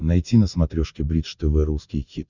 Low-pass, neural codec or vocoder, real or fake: 7.2 kHz; none; real